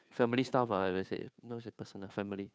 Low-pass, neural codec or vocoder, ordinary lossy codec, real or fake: none; codec, 16 kHz, 2 kbps, FunCodec, trained on Chinese and English, 25 frames a second; none; fake